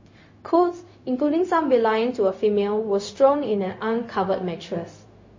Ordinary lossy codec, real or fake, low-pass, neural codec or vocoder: MP3, 32 kbps; fake; 7.2 kHz; codec, 16 kHz, 0.4 kbps, LongCat-Audio-Codec